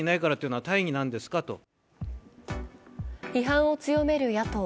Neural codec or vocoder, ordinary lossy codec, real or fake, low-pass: none; none; real; none